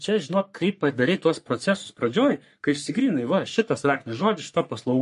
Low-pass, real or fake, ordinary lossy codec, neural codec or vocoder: 14.4 kHz; fake; MP3, 48 kbps; codec, 44.1 kHz, 3.4 kbps, Pupu-Codec